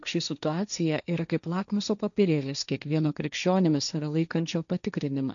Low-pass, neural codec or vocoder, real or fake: 7.2 kHz; codec, 16 kHz, 1.1 kbps, Voila-Tokenizer; fake